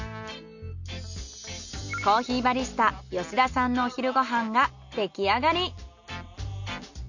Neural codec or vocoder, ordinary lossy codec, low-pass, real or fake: none; none; 7.2 kHz; real